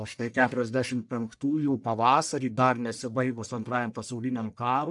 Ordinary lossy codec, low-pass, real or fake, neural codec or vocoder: MP3, 96 kbps; 10.8 kHz; fake; codec, 44.1 kHz, 1.7 kbps, Pupu-Codec